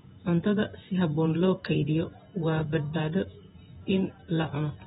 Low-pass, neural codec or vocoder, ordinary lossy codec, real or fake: 19.8 kHz; vocoder, 48 kHz, 128 mel bands, Vocos; AAC, 16 kbps; fake